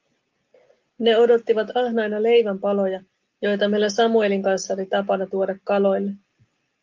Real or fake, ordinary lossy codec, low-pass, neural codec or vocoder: real; Opus, 24 kbps; 7.2 kHz; none